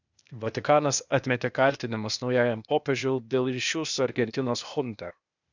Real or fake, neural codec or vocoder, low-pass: fake; codec, 16 kHz, 0.8 kbps, ZipCodec; 7.2 kHz